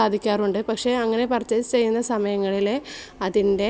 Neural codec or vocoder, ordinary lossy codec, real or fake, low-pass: none; none; real; none